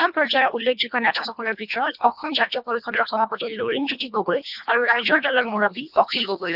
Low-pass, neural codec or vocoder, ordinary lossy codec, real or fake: 5.4 kHz; codec, 24 kHz, 1.5 kbps, HILCodec; none; fake